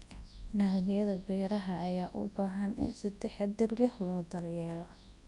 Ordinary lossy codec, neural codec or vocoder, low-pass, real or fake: Opus, 64 kbps; codec, 24 kHz, 0.9 kbps, WavTokenizer, large speech release; 10.8 kHz; fake